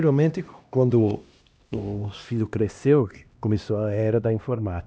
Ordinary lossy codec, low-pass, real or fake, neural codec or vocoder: none; none; fake; codec, 16 kHz, 1 kbps, X-Codec, HuBERT features, trained on LibriSpeech